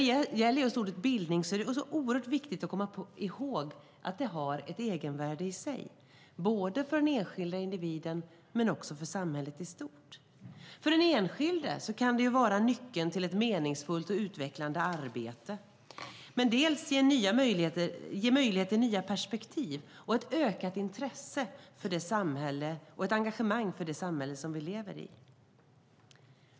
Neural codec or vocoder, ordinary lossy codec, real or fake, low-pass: none; none; real; none